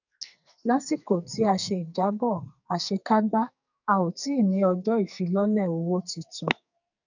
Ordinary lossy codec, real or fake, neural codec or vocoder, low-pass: none; fake; codec, 44.1 kHz, 2.6 kbps, SNAC; 7.2 kHz